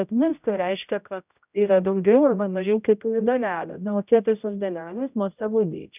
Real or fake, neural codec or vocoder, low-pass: fake; codec, 16 kHz, 0.5 kbps, X-Codec, HuBERT features, trained on general audio; 3.6 kHz